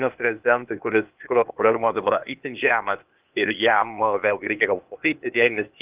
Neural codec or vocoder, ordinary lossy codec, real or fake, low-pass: codec, 16 kHz, 0.8 kbps, ZipCodec; Opus, 24 kbps; fake; 3.6 kHz